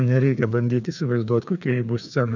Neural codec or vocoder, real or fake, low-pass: codec, 16 kHz, 2 kbps, FreqCodec, larger model; fake; 7.2 kHz